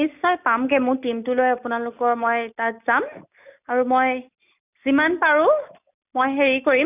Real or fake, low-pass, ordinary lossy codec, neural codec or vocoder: real; 3.6 kHz; none; none